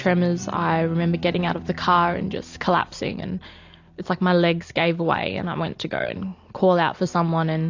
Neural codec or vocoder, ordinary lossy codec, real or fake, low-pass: none; AAC, 48 kbps; real; 7.2 kHz